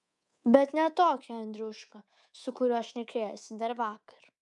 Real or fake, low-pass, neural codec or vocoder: fake; 10.8 kHz; codec, 24 kHz, 3.1 kbps, DualCodec